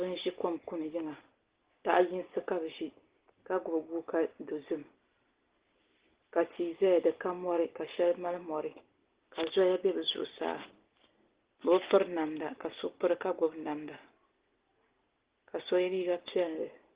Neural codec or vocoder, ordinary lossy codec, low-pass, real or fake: none; Opus, 16 kbps; 3.6 kHz; real